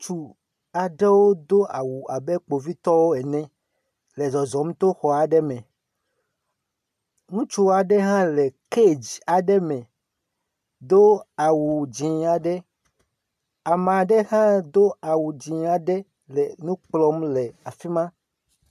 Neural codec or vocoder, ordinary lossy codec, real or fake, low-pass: none; AAC, 96 kbps; real; 14.4 kHz